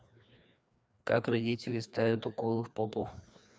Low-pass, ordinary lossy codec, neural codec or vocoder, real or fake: none; none; codec, 16 kHz, 2 kbps, FreqCodec, larger model; fake